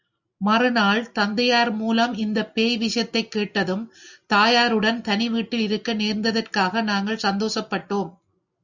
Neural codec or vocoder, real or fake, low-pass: none; real; 7.2 kHz